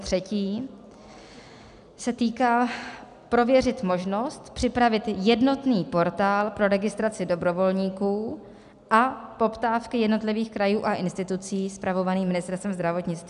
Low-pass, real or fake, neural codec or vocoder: 10.8 kHz; real; none